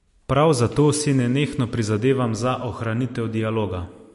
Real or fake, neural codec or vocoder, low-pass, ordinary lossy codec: real; none; 14.4 kHz; MP3, 48 kbps